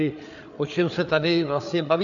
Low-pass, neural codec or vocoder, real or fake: 7.2 kHz; codec, 16 kHz, 8 kbps, FreqCodec, larger model; fake